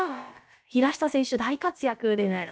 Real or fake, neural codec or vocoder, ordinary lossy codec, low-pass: fake; codec, 16 kHz, about 1 kbps, DyCAST, with the encoder's durations; none; none